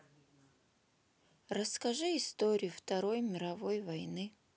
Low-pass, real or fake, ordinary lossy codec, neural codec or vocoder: none; real; none; none